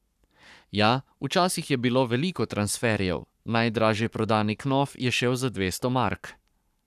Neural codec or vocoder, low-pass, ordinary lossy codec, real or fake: codec, 44.1 kHz, 7.8 kbps, Pupu-Codec; 14.4 kHz; none; fake